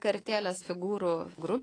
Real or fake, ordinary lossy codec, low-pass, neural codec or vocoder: fake; AAC, 32 kbps; 9.9 kHz; codec, 24 kHz, 3.1 kbps, DualCodec